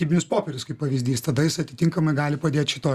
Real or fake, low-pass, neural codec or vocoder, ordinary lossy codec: real; 14.4 kHz; none; Opus, 64 kbps